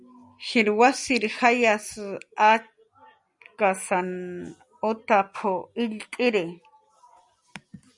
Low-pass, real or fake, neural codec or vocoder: 10.8 kHz; real; none